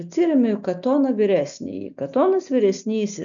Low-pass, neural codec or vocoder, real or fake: 7.2 kHz; none; real